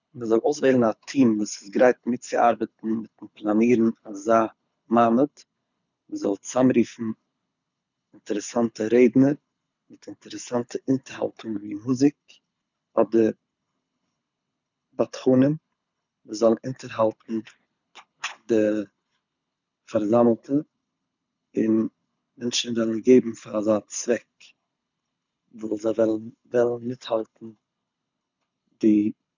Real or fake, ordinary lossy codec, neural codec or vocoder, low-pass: fake; none; codec, 24 kHz, 6 kbps, HILCodec; 7.2 kHz